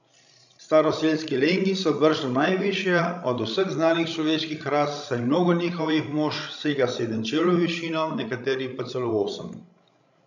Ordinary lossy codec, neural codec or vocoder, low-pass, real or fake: none; codec, 16 kHz, 16 kbps, FreqCodec, larger model; 7.2 kHz; fake